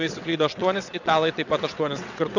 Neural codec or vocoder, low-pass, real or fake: vocoder, 22.05 kHz, 80 mel bands, WaveNeXt; 7.2 kHz; fake